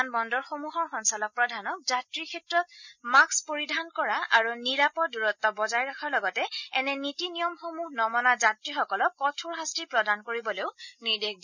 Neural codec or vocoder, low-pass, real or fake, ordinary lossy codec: none; 7.2 kHz; real; none